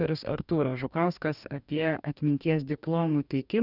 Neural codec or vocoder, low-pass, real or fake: codec, 44.1 kHz, 2.6 kbps, DAC; 5.4 kHz; fake